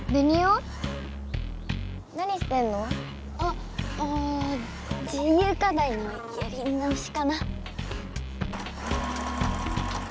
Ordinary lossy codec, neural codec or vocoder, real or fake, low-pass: none; none; real; none